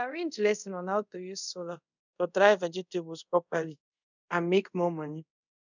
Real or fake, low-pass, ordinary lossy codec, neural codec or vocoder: fake; 7.2 kHz; none; codec, 24 kHz, 0.5 kbps, DualCodec